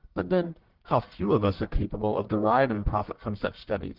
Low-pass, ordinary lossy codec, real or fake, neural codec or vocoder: 5.4 kHz; Opus, 16 kbps; fake; codec, 44.1 kHz, 1.7 kbps, Pupu-Codec